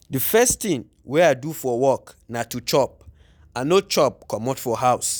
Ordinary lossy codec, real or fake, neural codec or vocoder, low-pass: none; real; none; none